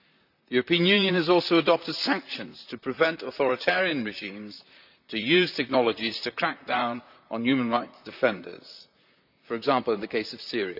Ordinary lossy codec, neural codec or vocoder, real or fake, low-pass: none; vocoder, 44.1 kHz, 128 mel bands, Pupu-Vocoder; fake; 5.4 kHz